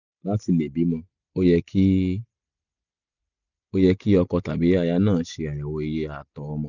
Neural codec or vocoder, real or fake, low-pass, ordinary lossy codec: none; real; 7.2 kHz; none